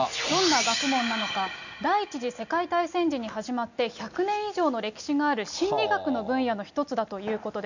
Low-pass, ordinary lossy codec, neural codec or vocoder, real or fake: 7.2 kHz; none; none; real